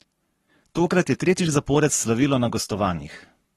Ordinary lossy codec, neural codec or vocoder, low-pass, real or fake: AAC, 32 kbps; codec, 44.1 kHz, 7.8 kbps, Pupu-Codec; 19.8 kHz; fake